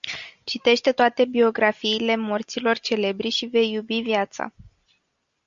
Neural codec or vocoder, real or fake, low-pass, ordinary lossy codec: none; real; 7.2 kHz; Opus, 64 kbps